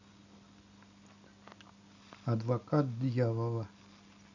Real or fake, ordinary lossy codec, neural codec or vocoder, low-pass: real; none; none; 7.2 kHz